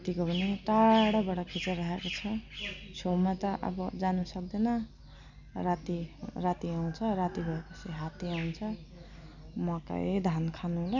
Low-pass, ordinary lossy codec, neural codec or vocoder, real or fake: 7.2 kHz; none; none; real